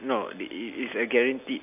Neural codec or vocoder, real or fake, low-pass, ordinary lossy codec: none; real; 3.6 kHz; none